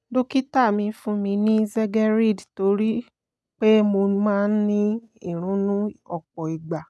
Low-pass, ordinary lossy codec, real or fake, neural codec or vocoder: none; none; real; none